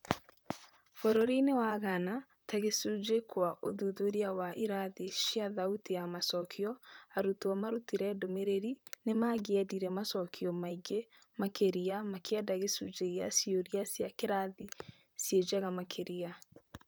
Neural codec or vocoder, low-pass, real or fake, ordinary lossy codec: vocoder, 44.1 kHz, 128 mel bands, Pupu-Vocoder; none; fake; none